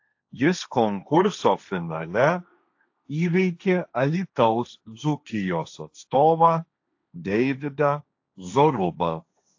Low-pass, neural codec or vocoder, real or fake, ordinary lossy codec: 7.2 kHz; codec, 16 kHz, 1.1 kbps, Voila-Tokenizer; fake; AAC, 48 kbps